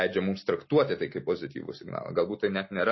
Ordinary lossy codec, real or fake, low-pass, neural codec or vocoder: MP3, 24 kbps; real; 7.2 kHz; none